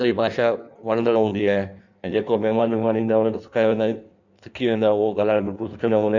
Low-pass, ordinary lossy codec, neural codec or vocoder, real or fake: 7.2 kHz; none; codec, 16 kHz in and 24 kHz out, 1.1 kbps, FireRedTTS-2 codec; fake